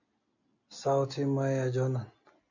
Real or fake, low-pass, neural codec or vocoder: real; 7.2 kHz; none